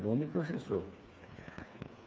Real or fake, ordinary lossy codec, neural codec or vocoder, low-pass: fake; none; codec, 16 kHz, 4 kbps, FreqCodec, smaller model; none